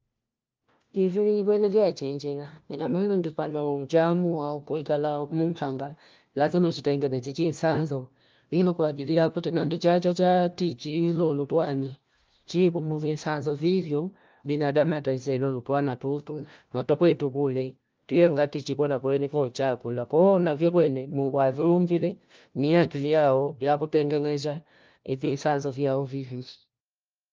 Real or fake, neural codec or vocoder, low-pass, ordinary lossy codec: fake; codec, 16 kHz, 1 kbps, FunCodec, trained on LibriTTS, 50 frames a second; 7.2 kHz; Opus, 24 kbps